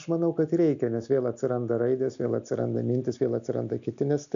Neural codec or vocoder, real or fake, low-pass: none; real; 7.2 kHz